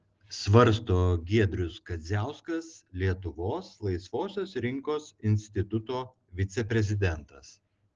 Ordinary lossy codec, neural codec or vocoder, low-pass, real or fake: Opus, 16 kbps; none; 7.2 kHz; real